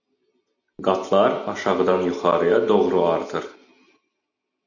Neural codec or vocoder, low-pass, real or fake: none; 7.2 kHz; real